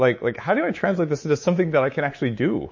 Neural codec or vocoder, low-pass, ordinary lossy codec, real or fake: codec, 24 kHz, 3.1 kbps, DualCodec; 7.2 kHz; MP3, 32 kbps; fake